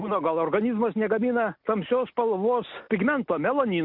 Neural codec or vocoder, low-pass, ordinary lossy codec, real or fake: none; 5.4 kHz; AAC, 48 kbps; real